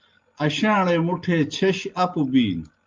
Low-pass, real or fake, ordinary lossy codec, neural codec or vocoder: 7.2 kHz; real; Opus, 24 kbps; none